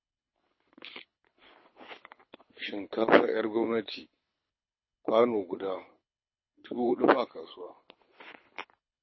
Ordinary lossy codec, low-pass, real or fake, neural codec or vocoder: MP3, 24 kbps; 7.2 kHz; fake; codec, 24 kHz, 6 kbps, HILCodec